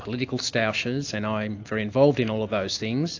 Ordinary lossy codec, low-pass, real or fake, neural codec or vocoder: AAC, 48 kbps; 7.2 kHz; real; none